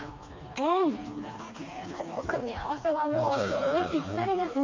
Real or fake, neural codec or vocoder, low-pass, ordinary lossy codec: fake; codec, 16 kHz, 2 kbps, FreqCodec, smaller model; 7.2 kHz; MP3, 48 kbps